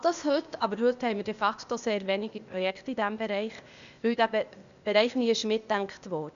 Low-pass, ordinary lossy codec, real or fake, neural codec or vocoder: 7.2 kHz; none; fake; codec, 16 kHz, 0.8 kbps, ZipCodec